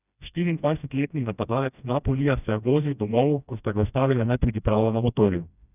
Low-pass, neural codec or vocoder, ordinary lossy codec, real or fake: 3.6 kHz; codec, 16 kHz, 1 kbps, FreqCodec, smaller model; none; fake